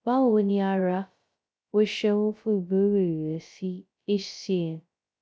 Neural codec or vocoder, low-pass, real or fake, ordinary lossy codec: codec, 16 kHz, 0.2 kbps, FocalCodec; none; fake; none